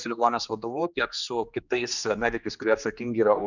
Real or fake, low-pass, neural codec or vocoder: fake; 7.2 kHz; codec, 16 kHz, 2 kbps, X-Codec, HuBERT features, trained on general audio